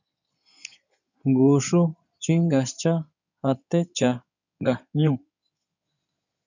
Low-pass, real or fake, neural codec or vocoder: 7.2 kHz; fake; codec, 16 kHz in and 24 kHz out, 2.2 kbps, FireRedTTS-2 codec